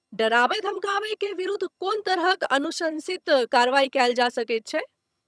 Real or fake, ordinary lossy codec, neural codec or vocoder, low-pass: fake; none; vocoder, 22.05 kHz, 80 mel bands, HiFi-GAN; none